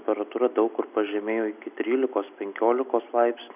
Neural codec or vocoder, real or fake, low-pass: none; real; 3.6 kHz